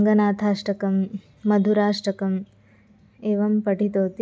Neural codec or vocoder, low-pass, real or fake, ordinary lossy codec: none; none; real; none